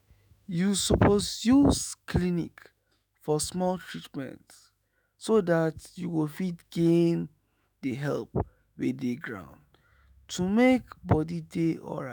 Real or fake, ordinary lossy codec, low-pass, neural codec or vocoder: fake; none; none; autoencoder, 48 kHz, 128 numbers a frame, DAC-VAE, trained on Japanese speech